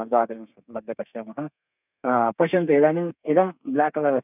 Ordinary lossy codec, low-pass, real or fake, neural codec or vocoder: none; 3.6 kHz; fake; codec, 32 kHz, 1.9 kbps, SNAC